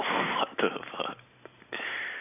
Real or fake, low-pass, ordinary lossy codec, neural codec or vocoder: real; 3.6 kHz; AAC, 32 kbps; none